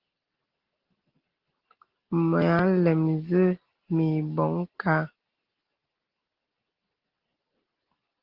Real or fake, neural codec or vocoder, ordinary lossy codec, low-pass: real; none; Opus, 16 kbps; 5.4 kHz